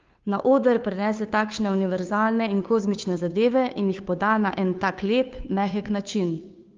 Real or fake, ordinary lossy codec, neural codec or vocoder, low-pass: fake; Opus, 24 kbps; codec, 16 kHz, 2 kbps, FunCodec, trained on Chinese and English, 25 frames a second; 7.2 kHz